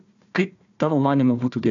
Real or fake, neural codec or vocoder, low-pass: fake; codec, 16 kHz, 1 kbps, FunCodec, trained on Chinese and English, 50 frames a second; 7.2 kHz